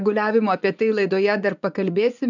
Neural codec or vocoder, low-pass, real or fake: vocoder, 24 kHz, 100 mel bands, Vocos; 7.2 kHz; fake